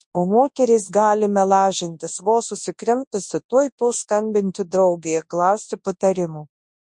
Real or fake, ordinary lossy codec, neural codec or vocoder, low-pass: fake; MP3, 48 kbps; codec, 24 kHz, 0.9 kbps, WavTokenizer, large speech release; 10.8 kHz